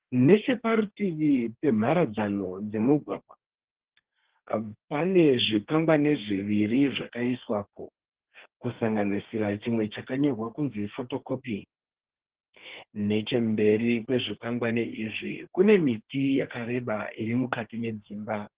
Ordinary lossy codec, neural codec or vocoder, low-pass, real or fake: Opus, 16 kbps; codec, 32 kHz, 1.9 kbps, SNAC; 3.6 kHz; fake